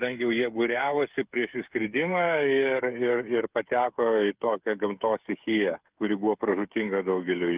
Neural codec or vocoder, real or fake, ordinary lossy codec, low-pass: codec, 16 kHz, 8 kbps, FreqCodec, smaller model; fake; Opus, 32 kbps; 3.6 kHz